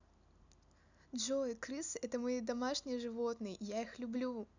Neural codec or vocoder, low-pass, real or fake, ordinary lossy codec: none; 7.2 kHz; real; none